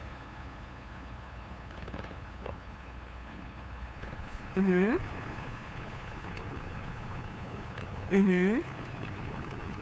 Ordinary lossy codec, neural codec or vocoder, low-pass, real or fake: none; codec, 16 kHz, 2 kbps, FunCodec, trained on LibriTTS, 25 frames a second; none; fake